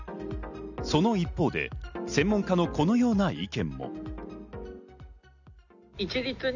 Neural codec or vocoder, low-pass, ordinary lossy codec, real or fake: none; 7.2 kHz; none; real